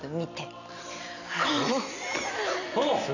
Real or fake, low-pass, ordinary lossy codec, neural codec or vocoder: real; 7.2 kHz; none; none